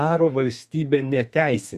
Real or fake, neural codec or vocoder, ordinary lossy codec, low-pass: fake; codec, 32 kHz, 1.9 kbps, SNAC; Opus, 64 kbps; 14.4 kHz